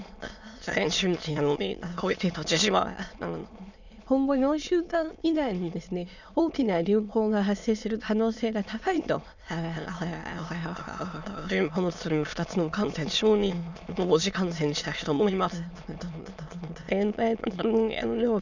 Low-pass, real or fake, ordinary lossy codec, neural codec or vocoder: 7.2 kHz; fake; none; autoencoder, 22.05 kHz, a latent of 192 numbers a frame, VITS, trained on many speakers